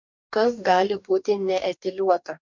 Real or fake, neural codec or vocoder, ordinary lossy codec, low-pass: fake; codec, 44.1 kHz, 2.6 kbps, DAC; MP3, 64 kbps; 7.2 kHz